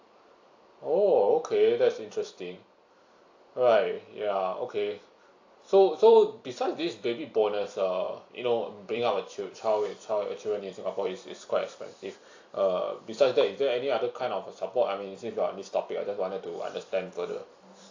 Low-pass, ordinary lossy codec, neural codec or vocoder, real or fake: 7.2 kHz; AAC, 48 kbps; vocoder, 44.1 kHz, 128 mel bands every 256 samples, BigVGAN v2; fake